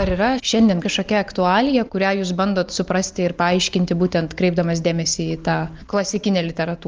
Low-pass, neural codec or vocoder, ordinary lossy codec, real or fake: 7.2 kHz; none; Opus, 24 kbps; real